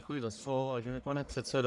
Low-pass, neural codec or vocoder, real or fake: 10.8 kHz; codec, 44.1 kHz, 1.7 kbps, Pupu-Codec; fake